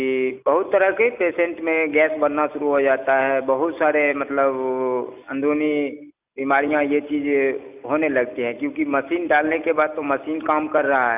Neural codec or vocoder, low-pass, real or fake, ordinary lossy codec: none; 3.6 kHz; real; none